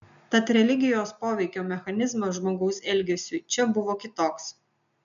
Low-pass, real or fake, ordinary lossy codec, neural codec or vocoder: 7.2 kHz; real; MP3, 96 kbps; none